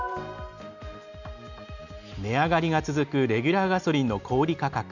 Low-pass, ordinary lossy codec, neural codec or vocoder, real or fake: 7.2 kHz; none; none; real